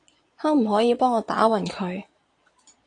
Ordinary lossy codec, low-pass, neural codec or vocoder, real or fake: AAC, 48 kbps; 9.9 kHz; vocoder, 22.05 kHz, 80 mel bands, Vocos; fake